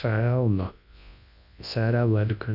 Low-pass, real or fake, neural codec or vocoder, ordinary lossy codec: 5.4 kHz; fake; codec, 24 kHz, 0.9 kbps, WavTokenizer, large speech release; none